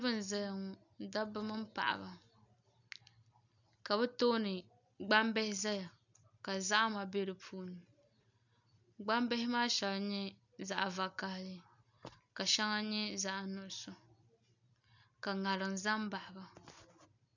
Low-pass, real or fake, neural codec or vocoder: 7.2 kHz; real; none